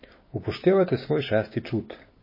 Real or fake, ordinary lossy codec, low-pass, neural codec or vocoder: fake; MP3, 24 kbps; 5.4 kHz; codec, 16 kHz, 6 kbps, DAC